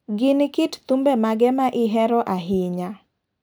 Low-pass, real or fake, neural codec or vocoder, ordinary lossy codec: none; real; none; none